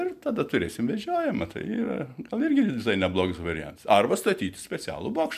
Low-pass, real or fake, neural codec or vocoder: 14.4 kHz; real; none